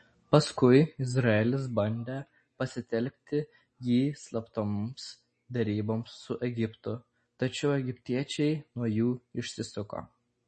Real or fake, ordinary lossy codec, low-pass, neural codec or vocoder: real; MP3, 32 kbps; 9.9 kHz; none